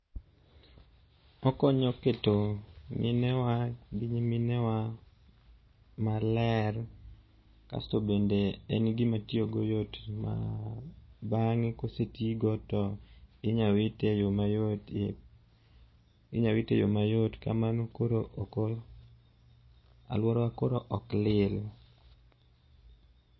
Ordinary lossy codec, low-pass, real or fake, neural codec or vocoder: MP3, 24 kbps; 7.2 kHz; real; none